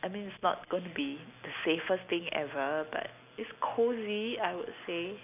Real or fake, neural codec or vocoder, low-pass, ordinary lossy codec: real; none; 3.6 kHz; none